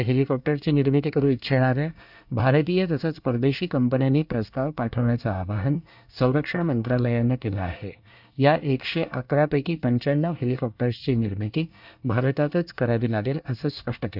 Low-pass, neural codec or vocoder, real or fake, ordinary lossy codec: 5.4 kHz; codec, 24 kHz, 1 kbps, SNAC; fake; none